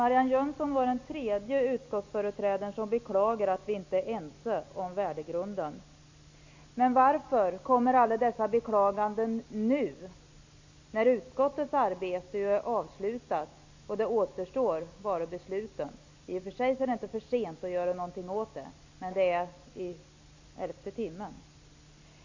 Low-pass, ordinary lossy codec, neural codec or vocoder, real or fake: 7.2 kHz; AAC, 48 kbps; none; real